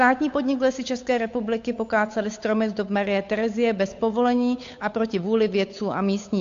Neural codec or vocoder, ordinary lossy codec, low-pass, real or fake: codec, 16 kHz, 8 kbps, FunCodec, trained on Chinese and English, 25 frames a second; AAC, 48 kbps; 7.2 kHz; fake